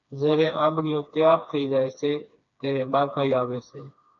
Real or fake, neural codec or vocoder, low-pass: fake; codec, 16 kHz, 2 kbps, FreqCodec, smaller model; 7.2 kHz